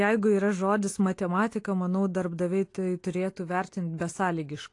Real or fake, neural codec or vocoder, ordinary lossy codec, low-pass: real; none; AAC, 48 kbps; 10.8 kHz